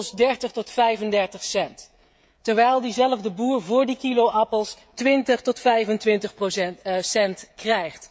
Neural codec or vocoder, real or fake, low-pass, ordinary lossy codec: codec, 16 kHz, 16 kbps, FreqCodec, smaller model; fake; none; none